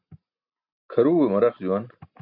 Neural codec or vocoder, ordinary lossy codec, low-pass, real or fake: none; MP3, 48 kbps; 5.4 kHz; real